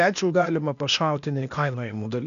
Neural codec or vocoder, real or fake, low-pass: codec, 16 kHz, 0.8 kbps, ZipCodec; fake; 7.2 kHz